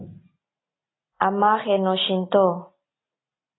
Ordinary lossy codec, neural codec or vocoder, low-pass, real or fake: AAC, 16 kbps; none; 7.2 kHz; real